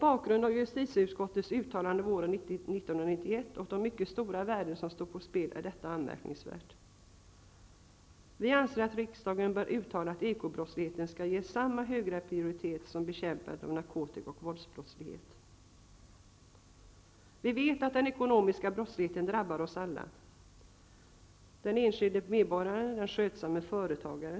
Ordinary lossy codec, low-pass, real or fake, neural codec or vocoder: none; none; real; none